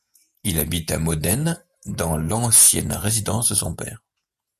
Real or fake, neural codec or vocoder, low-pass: fake; vocoder, 48 kHz, 128 mel bands, Vocos; 14.4 kHz